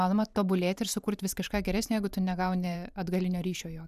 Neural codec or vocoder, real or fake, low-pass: vocoder, 44.1 kHz, 128 mel bands every 512 samples, BigVGAN v2; fake; 14.4 kHz